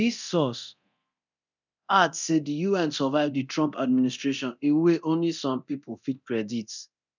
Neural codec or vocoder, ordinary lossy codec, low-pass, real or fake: codec, 24 kHz, 0.9 kbps, DualCodec; none; 7.2 kHz; fake